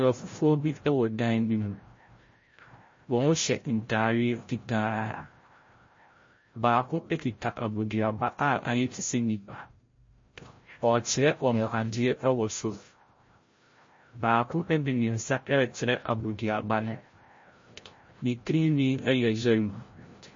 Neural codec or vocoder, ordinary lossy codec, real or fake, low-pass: codec, 16 kHz, 0.5 kbps, FreqCodec, larger model; MP3, 32 kbps; fake; 7.2 kHz